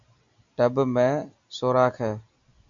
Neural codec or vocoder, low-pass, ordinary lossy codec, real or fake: none; 7.2 kHz; AAC, 64 kbps; real